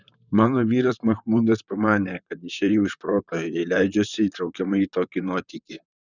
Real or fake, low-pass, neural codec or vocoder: fake; 7.2 kHz; vocoder, 44.1 kHz, 128 mel bands, Pupu-Vocoder